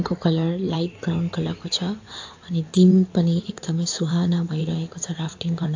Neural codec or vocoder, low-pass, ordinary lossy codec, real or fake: codec, 16 kHz in and 24 kHz out, 2.2 kbps, FireRedTTS-2 codec; 7.2 kHz; AAC, 48 kbps; fake